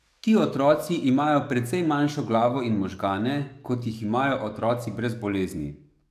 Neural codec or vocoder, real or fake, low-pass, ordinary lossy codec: codec, 44.1 kHz, 7.8 kbps, DAC; fake; 14.4 kHz; none